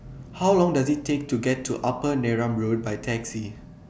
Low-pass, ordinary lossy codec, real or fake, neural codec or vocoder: none; none; real; none